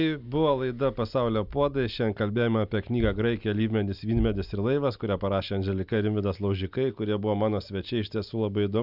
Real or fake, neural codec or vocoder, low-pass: real; none; 5.4 kHz